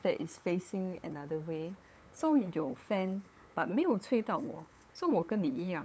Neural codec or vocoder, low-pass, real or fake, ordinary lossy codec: codec, 16 kHz, 8 kbps, FunCodec, trained on LibriTTS, 25 frames a second; none; fake; none